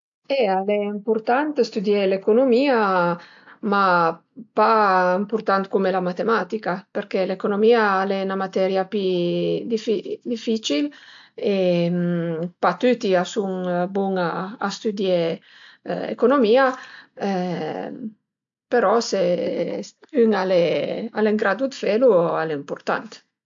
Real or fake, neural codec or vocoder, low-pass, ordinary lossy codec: real; none; 7.2 kHz; AAC, 64 kbps